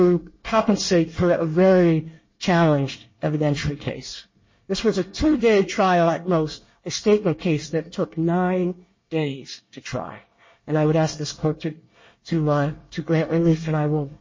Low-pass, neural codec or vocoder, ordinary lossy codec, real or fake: 7.2 kHz; codec, 24 kHz, 1 kbps, SNAC; MP3, 32 kbps; fake